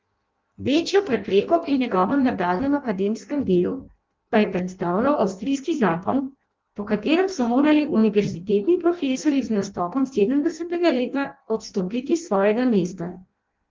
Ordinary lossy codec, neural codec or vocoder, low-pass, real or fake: Opus, 32 kbps; codec, 16 kHz in and 24 kHz out, 0.6 kbps, FireRedTTS-2 codec; 7.2 kHz; fake